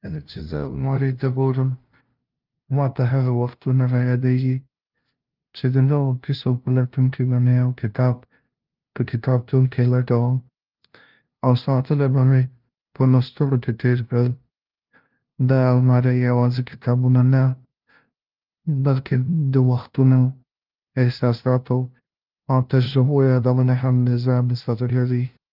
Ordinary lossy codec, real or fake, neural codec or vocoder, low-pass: Opus, 32 kbps; fake; codec, 16 kHz, 0.5 kbps, FunCodec, trained on LibriTTS, 25 frames a second; 5.4 kHz